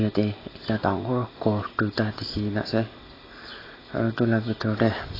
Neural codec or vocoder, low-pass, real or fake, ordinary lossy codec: none; 5.4 kHz; real; AAC, 24 kbps